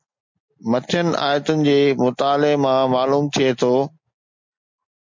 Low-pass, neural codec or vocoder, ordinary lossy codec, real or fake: 7.2 kHz; none; MP3, 48 kbps; real